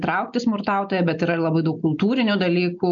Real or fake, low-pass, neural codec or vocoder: real; 7.2 kHz; none